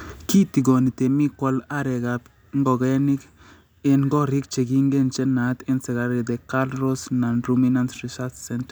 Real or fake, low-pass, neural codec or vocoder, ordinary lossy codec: real; none; none; none